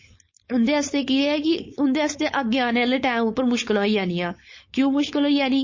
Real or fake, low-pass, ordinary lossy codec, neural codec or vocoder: fake; 7.2 kHz; MP3, 32 kbps; codec, 16 kHz, 4.8 kbps, FACodec